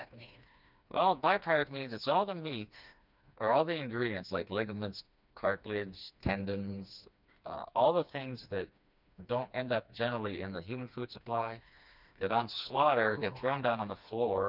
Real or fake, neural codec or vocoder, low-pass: fake; codec, 16 kHz, 2 kbps, FreqCodec, smaller model; 5.4 kHz